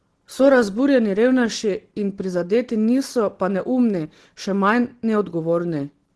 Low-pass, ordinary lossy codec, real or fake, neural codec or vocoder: 10.8 kHz; Opus, 16 kbps; real; none